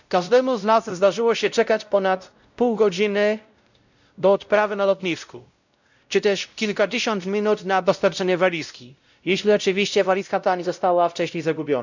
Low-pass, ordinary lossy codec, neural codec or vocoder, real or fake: 7.2 kHz; none; codec, 16 kHz, 0.5 kbps, X-Codec, WavLM features, trained on Multilingual LibriSpeech; fake